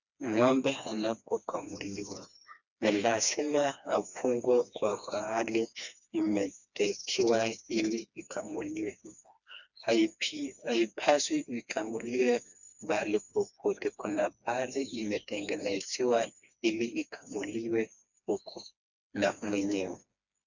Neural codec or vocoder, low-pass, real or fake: codec, 16 kHz, 2 kbps, FreqCodec, smaller model; 7.2 kHz; fake